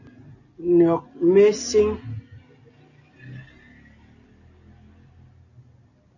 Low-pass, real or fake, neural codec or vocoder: 7.2 kHz; real; none